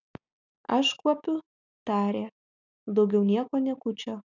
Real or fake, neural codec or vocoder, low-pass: real; none; 7.2 kHz